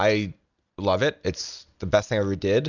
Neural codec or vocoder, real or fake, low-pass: none; real; 7.2 kHz